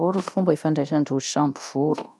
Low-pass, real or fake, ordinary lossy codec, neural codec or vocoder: none; fake; none; codec, 24 kHz, 0.9 kbps, DualCodec